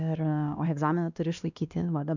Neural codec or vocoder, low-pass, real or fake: codec, 16 kHz, 1 kbps, X-Codec, WavLM features, trained on Multilingual LibriSpeech; 7.2 kHz; fake